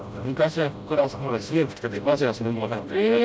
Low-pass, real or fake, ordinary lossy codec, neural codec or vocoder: none; fake; none; codec, 16 kHz, 0.5 kbps, FreqCodec, smaller model